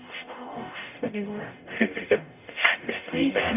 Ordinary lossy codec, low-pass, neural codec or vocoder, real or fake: none; 3.6 kHz; codec, 44.1 kHz, 0.9 kbps, DAC; fake